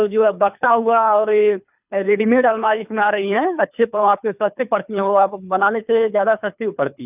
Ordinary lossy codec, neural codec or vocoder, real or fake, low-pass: none; codec, 24 kHz, 3 kbps, HILCodec; fake; 3.6 kHz